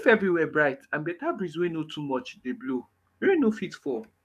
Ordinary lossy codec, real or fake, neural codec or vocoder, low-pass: none; fake; codec, 44.1 kHz, 7.8 kbps, DAC; 14.4 kHz